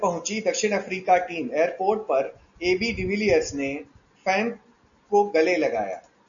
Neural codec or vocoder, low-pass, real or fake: none; 7.2 kHz; real